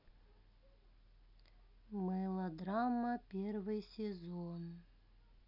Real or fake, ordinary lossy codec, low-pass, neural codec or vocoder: real; none; 5.4 kHz; none